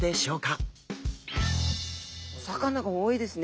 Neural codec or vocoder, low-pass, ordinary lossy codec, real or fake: none; none; none; real